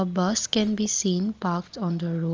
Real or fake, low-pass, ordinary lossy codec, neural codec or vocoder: real; 7.2 kHz; Opus, 24 kbps; none